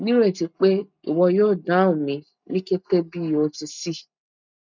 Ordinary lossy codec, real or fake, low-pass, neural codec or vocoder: none; real; 7.2 kHz; none